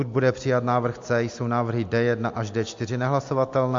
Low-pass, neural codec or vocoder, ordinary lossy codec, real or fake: 7.2 kHz; none; MP3, 48 kbps; real